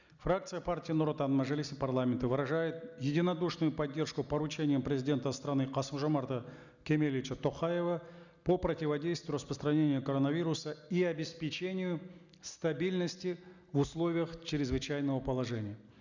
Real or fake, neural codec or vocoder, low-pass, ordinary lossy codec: real; none; 7.2 kHz; none